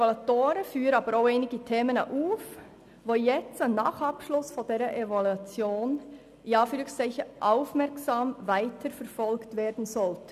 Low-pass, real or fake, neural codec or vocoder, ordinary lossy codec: 14.4 kHz; real; none; none